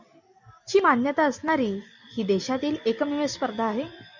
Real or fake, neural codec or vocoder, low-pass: real; none; 7.2 kHz